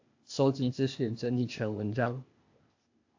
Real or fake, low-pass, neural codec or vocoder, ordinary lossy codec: fake; 7.2 kHz; codec, 16 kHz, 0.8 kbps, ZipCodec; AAC, 48 kbps